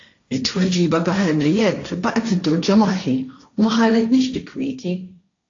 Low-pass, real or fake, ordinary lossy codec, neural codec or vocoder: 7.2 kHz; fake; AAC, 48 kbps; codec, 16 kHz, 1.1 kbps, Voila-Tokenizer